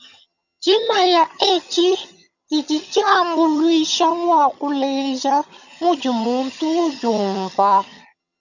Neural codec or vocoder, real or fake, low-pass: vocoder, 22.05 kHz, 80 mel bands, HiFi-GAN; fake; 7.2 kHz